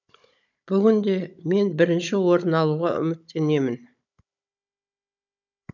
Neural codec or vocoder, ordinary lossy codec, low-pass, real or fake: codec, 16 kHz, 16 kbps, FunCodec, trained on Chinese and English, 50 frames a second; none; 7.2 kHz; fake